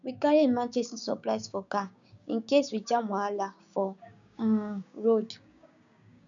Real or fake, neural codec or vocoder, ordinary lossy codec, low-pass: fake; codec, 16 kHz, 6 kbps, DAC; none; 7.2 kHz